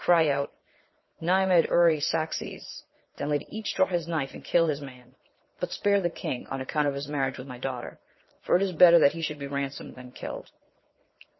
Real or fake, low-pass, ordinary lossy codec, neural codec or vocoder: fake; 7.2 kHz; MP3, 24 kbps; vocoder, 22.05 kHz, 80 mel bands, Vocos